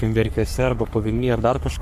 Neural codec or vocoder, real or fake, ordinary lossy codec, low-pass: codec, 44.1 kHz, 3.4 kbps, Pupu-Codec; fake; MP3, 96 kbps; 14.4 kHz